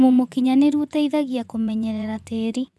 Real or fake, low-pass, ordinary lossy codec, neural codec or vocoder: fake; none; none; vocoder, 24 kHz, 100 mel bands, Vocos